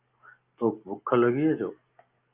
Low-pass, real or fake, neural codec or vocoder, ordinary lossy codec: 3.6 kHz; real; none; Opus, 32 kbps